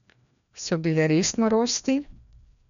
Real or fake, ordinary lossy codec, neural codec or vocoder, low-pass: fake; none; codec, 16 kHz, 1 kbps, FreqCodec, larger model; 7.2 kHz